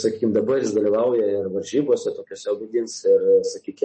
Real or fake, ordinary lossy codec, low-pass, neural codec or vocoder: real; MP3, 32 kbps; 10.8 kHz; none